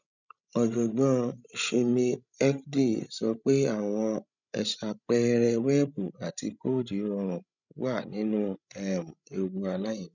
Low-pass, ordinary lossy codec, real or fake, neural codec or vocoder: 7.2 kHz; none; fake; codec, 16 kHz, 16 kbps, FreqCodec, larger model